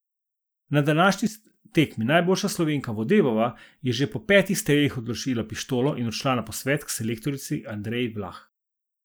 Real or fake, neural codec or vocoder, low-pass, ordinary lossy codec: real; none; none; none